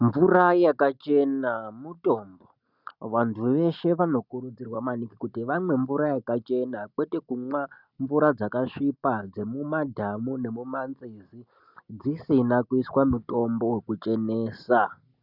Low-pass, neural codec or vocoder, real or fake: 5.4 kHz; none; real